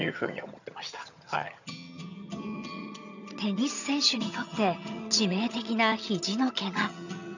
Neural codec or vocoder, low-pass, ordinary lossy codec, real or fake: vocoder, 22.05 kHz, 80 mel bands, HiFi-GAN; 7.2 kHz; none; fake